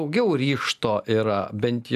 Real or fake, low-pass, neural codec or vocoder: real; 14.4 kHz; none